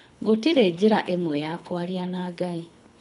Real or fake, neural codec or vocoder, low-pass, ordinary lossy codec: fake; codec, 24 kHz, 3 kbps, HILCodec; 10.8 kHz; none